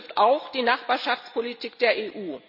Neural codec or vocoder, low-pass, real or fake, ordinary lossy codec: none; 5.4 kHz; real; none